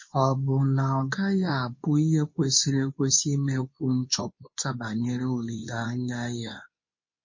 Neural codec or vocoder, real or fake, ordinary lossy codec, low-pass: codec, 24 kHz, 0.9 kbps, WavTokenizer, medium speech release version 2; fake; MP3, 32 kbps; 7.2 kHz